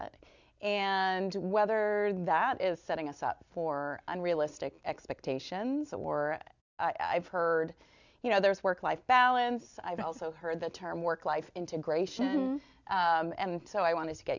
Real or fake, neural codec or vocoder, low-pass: real; none; 7.2 kHz